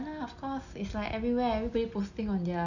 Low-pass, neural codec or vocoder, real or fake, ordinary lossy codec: 7.2 kHz; none; real; none